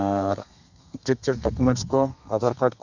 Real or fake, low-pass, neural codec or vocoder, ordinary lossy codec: fake; 7.2 kHz; codec, 32 kHz, 1.9 kbps, SNAC; none